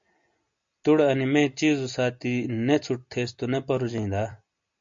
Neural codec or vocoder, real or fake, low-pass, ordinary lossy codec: none; real; 7.2 kHz; MP3, 48 kbps